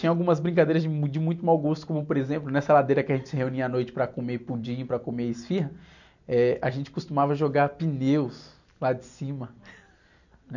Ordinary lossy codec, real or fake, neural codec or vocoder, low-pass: none; real; none; 7.2 kHz